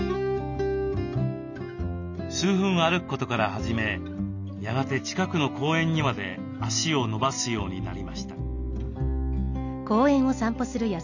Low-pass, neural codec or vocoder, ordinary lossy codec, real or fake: 7.2 kHz; none; none; real